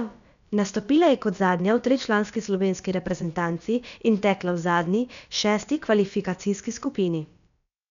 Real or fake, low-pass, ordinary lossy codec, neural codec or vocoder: fake; 7.2 kHz; none; codec, 16 kHz, about 1 kbps, DyCAST, with the encoder's durations